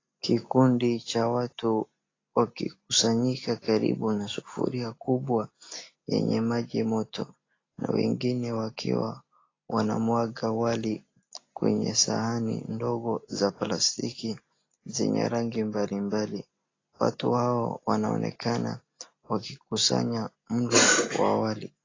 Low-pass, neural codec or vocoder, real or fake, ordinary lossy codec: 7.2 kHz; none; real; AAC, 32 kbps